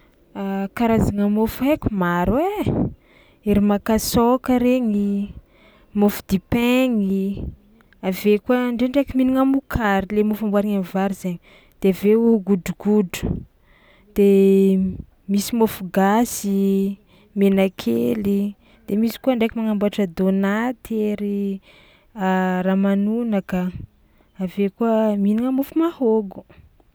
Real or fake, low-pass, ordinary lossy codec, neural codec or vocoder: real; none; none; none